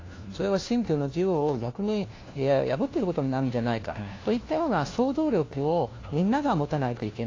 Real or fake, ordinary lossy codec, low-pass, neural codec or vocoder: fake; AAC, 32 kbps; 7.2 kHz; codec, 16 kHz, 1 kbps, FunCodec, trained on LibriTTS, 50 frames a second